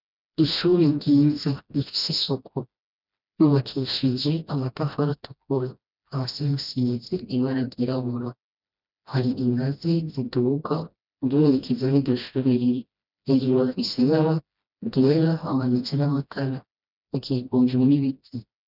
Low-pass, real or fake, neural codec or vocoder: 5.4 kHz; fake; codec, 16 kHz, 1 kbps, FreqCodec, smaller model